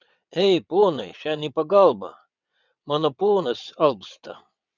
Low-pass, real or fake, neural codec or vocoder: 7.2 kHz; fake; vocoder, 22.05 kHz, 80 mel bands, WaveNeXt